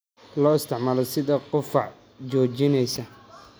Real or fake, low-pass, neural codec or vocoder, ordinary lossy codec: real; none; none; none